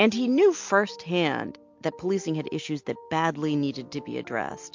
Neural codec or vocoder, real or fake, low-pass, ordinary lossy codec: none; real; 7.2 kHz; MP3, 48 kbps